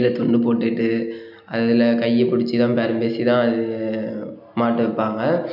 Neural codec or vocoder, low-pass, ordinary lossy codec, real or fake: none; 5.4 kHz; none; real